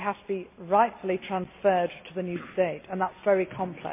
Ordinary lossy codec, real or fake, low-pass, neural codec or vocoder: MP3, 24 kbps; real; 3.6 kHz; none